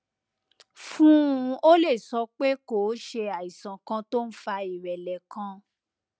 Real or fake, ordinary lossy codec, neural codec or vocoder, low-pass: real; none; none; none